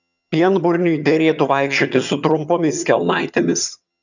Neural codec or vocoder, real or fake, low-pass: vocoder, 22.05 kHz, 80 mel bands, HiFi-GAN; fake; 7.2 kHz